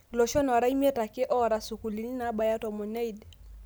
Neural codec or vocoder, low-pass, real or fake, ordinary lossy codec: none; none; real; none